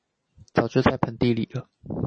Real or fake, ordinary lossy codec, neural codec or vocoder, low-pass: real; MP3, 32 kbps; none; 9.9 kHz